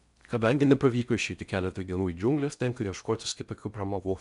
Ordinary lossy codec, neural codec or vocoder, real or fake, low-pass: MP3, 96 kbps; codec, 16 kHz in and 24 kHz out, 0.6 kbps, FocalCodec, streaming, 4096 codes; fake; 10.8 kHz